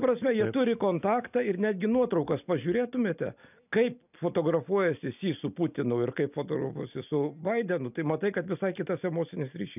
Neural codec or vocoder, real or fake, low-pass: none; real; 3.6 kHz